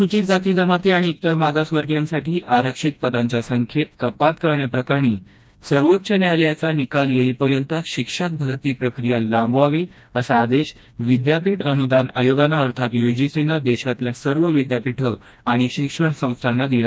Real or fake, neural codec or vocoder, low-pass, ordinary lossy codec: fake; codec, 16 kHz, 1 kbps, FreqCodec, smaller model; none; none